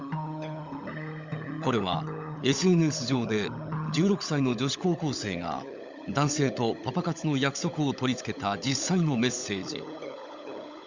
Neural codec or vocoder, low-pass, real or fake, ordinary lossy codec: codec, 16 kHz, 16 kbps, FunCodec, trained on LibriTTS, 50 frames a second; 7.2 kHz; fake; Opus, 64 kbps